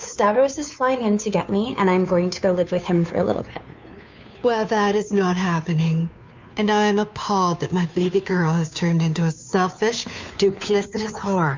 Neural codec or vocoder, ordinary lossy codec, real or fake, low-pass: codec, 16 kHz, 2 kbps, FunCodec, trained on Chinese and English, 25 frames a second; MP3, 64 kbps; fake; 7.2 kHz